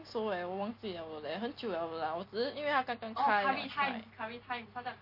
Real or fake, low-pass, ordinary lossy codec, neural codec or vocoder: real; 5.4 kHz; MP3, 32 kbps; none